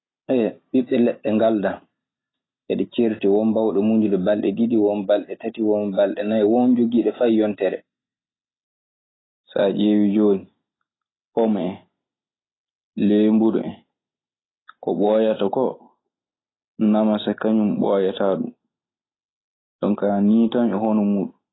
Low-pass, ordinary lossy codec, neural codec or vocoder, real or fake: 7.2 kHz; AAC, 16 kbps; none; real